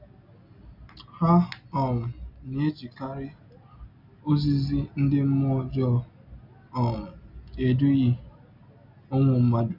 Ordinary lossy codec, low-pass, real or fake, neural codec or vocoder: none; 5.4 kHz; real; none